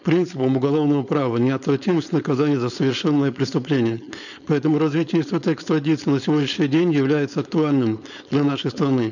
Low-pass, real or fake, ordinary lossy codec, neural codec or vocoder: 7.2 kHz; fake; none; codec, 16 kHz, 4.8 kbps, FACodec